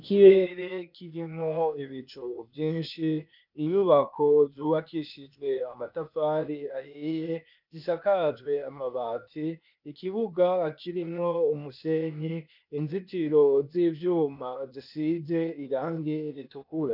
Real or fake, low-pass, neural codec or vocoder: fake; 5.4 kHz; codec, 16 kHz, 0.8 kbps, ZipCodec